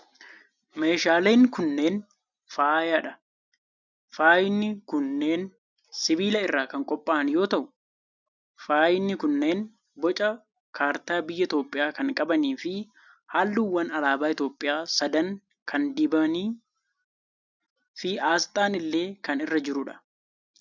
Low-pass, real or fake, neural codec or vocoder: 7.2 kHz; real; none